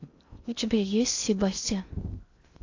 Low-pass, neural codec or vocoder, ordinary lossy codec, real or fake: 7.2 kHz; codec, 16 kHz in and 24 kHz out, 0.6 kbps, FocalCodec, streaming, 2048 codes; AAC, 48 kbps; fake